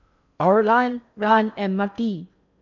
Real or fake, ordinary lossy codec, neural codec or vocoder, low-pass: fake; none; codec, 16 kHz in and 24 kHz out, 0.6 kbps, FocalCodec, streaming, 4096 codes; 7.2 kHz